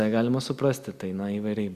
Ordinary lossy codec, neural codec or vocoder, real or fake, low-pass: Opus, 64 kbps; none; real; 14.4 kHz